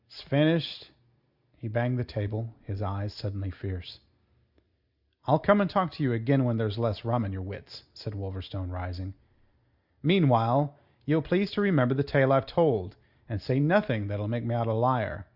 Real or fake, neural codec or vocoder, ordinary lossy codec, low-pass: real; none; Opus, 64 kbps; 5.4 kHz